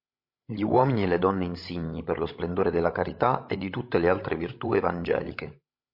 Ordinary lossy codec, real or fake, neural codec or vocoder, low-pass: MP3, 32 kbps; fake; codec, 16 kHz, 16 kbps, FreqCodec, larger model; 5.4 kHz